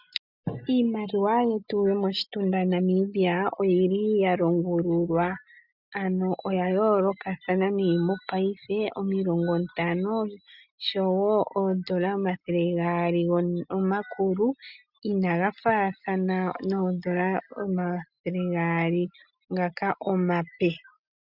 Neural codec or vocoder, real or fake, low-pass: none; real; 5.4 kHz